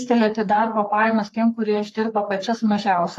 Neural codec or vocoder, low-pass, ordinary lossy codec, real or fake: codec, 44.1 kHz, 3.4 kbps, Pupu-Codec; 14.4 kHz; AAC, 48 kbps; fake